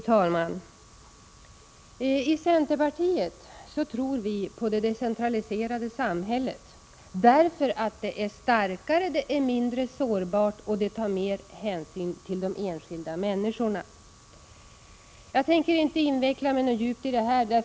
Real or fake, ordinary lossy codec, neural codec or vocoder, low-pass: real; none; none; none